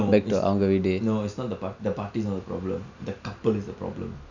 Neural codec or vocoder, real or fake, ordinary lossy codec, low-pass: none; real; none; 7.2 kHz